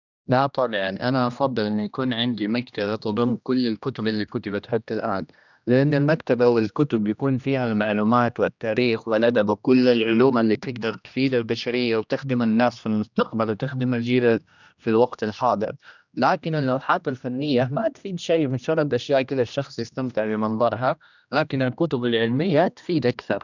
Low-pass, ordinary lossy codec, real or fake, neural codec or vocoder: 7.2 kHz; none; fake; codec, 16 kHz, 1 kbps, X-Codec, HuBERT features, trained on general audio